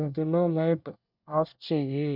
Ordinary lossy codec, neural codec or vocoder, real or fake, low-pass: none; codec, 24 kHz, 1 kbps, SNAC; fake; 5.4 kHz